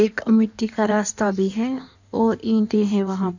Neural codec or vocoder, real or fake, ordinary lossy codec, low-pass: codec, 16 kHz in and 24 kHz out, 1.1 kbps, FireRedTTS-2 codec; fake; none; 7.2 kHz